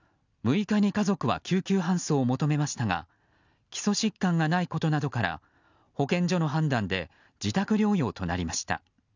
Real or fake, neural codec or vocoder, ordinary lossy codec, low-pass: real; none; none; 7.2 kHz